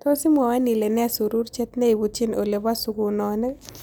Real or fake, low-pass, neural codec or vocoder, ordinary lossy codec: real; none; none; none